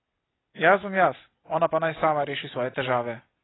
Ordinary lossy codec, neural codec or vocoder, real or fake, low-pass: AAC, 16 kbps; none; real; 7.2 kHz